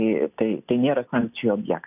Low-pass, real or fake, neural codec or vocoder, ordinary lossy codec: 3.6 kHz; real; none; AAC, 32 kbps